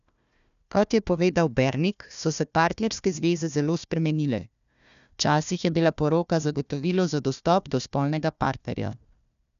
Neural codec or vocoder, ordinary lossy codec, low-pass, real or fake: codec, 16 kHz, 1 kbps, FunCodec, trained on Chinese and English, 50 frames a second; none; 7.2 kHz; fake